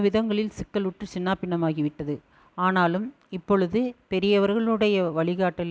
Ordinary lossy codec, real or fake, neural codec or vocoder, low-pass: none; real; none; none